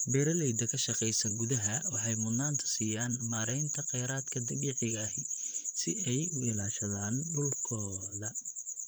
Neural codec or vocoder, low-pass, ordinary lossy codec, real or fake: vocoder, 44.1 kHz, 128 mel bands, Pupu-Vocoder; none; none; fake